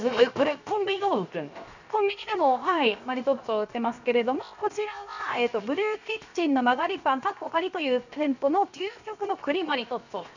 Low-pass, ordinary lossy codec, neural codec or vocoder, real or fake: 7.2 kHz; none; codec, 16 kHz, 0.7 kbps, FocalCodec; fake